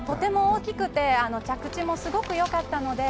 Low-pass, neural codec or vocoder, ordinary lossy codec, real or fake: none; none; none; real